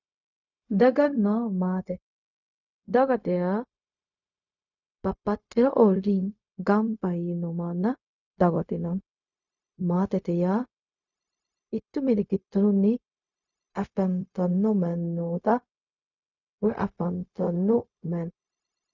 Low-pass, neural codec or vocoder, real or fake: 7.2 kHz; codec, 16 kHz, 0.4 kbps, LongCat-Audio-Codec; fake